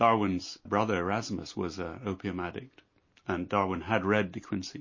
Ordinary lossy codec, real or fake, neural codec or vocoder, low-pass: MP3, 32 kbps; real; none; 7.2 kHz